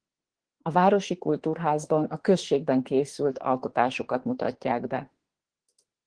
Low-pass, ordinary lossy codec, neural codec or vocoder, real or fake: 9.9 kHz; Opus, 16 kbps; autoencoder, 48 kHz, 32 numbers a frame, DAC-VAE, trained on Japanese speech; fake